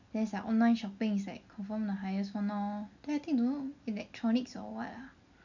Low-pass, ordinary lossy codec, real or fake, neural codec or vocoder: 7.2 kHz; none; real; none